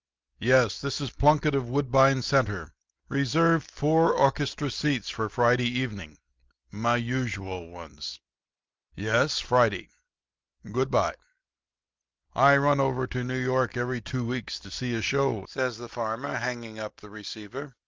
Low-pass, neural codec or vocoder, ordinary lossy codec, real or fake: 7.2 kHz; none; Opus, 32 kbps; real